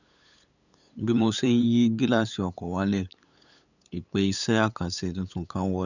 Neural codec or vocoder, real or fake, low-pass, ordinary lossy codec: codec, 16 kHz, 8 kbps, FunCodec, trained on LibriTTS, 25 frames a second; fake; 7.2 kHz; none